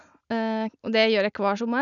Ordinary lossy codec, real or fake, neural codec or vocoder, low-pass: none; real; none; 7.2 kHz